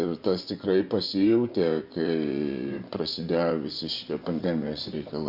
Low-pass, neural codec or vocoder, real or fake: 5.4 kHz; vocoder, 24 kHz, 100 mel bands, Vocos; fake